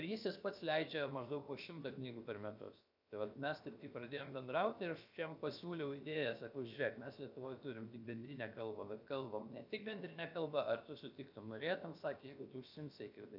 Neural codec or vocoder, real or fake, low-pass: codec, 16 kHz, about 1 kbps, DyCAST, with the encoder's durations; fake; 5.4 kHz